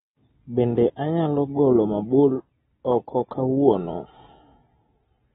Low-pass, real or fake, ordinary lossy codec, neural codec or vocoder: 19.8 kHz; real; AAC, 16 kbps; none